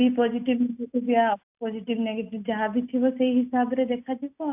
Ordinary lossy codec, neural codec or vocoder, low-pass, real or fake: AAC, 32 kbps; none; 3.6 kHz; real